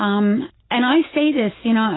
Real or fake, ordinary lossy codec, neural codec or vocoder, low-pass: real; AAC, 16 kbps; none; 7.2 kHz